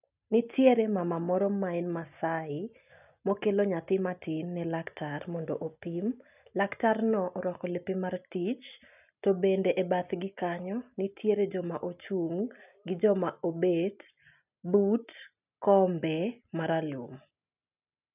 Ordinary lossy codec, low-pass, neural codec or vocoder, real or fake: none; 3.6 kHz; none; real